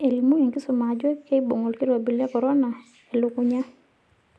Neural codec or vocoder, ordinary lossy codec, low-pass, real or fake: none; none; none; real